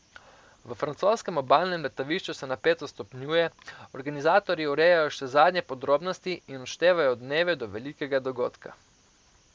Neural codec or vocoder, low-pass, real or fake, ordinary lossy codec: none; none; real; none